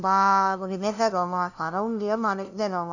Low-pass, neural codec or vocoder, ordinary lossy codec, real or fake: 7.2 kHz; codec, 16 kHz, 0.5 kbps, FunCodec, trained on LibriTTS, 25 frames a second; none; fake